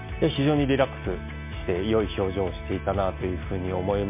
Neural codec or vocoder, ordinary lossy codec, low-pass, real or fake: none; MP3, 24 kbps; 3.6 kHz; real